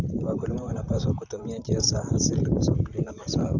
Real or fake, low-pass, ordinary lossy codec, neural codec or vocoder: fake; 7.2 kHz; none; vocoder, 44.1 kHz, 128 mel bands, Pupu-Vocoder